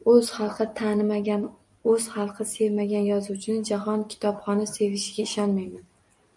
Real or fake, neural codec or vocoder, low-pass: real; none; 10.8 kHz